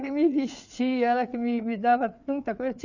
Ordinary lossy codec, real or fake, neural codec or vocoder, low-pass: none; fake; codec, 16 kHz, 4 kbps, FreqCodec, larger model; 7.2 kHz